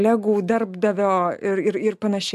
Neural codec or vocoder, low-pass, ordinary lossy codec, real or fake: none; 14.4 kHz; AAC, 96 kbps; real